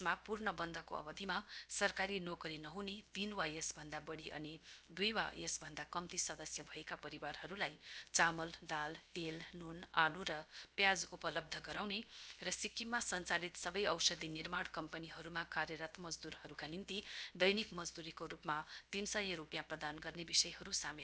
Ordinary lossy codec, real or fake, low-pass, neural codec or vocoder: none; fake; none; codec, 16 kHz, about 1 kbps, DyCAST, with the encoder's durations